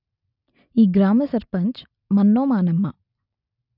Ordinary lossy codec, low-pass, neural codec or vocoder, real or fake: none; 5.4 kHz; none; real